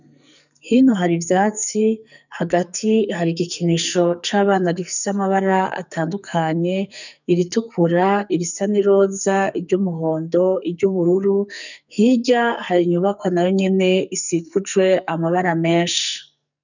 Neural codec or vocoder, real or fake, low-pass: codec, 44.1 kHz, 2.6 kbps, SNAC; fake; 7.2 kHz